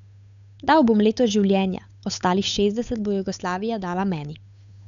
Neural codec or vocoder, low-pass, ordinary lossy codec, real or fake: codec, 16 kHz, 8 kbps, FunCodec, trained on Chinese and English, 25 frames a second; 7.2 kHz; none; fake